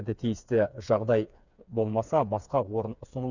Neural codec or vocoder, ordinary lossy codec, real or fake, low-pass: codec, 16 kHz, 4 kbps, FreqCodec, smaller model; none; fake; 7.2 kHz